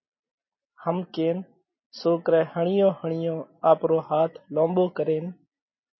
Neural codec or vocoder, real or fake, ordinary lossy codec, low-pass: none; real; MP3, 24 kbps; 7.2 kHz